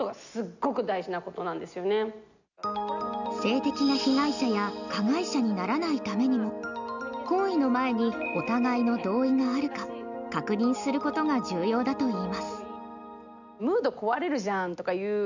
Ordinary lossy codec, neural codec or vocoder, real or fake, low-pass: none; none; real; 7.2 kHz